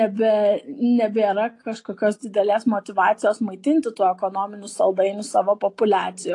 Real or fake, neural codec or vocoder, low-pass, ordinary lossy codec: real; none; 10.8 kHz; AAC, 48 kbps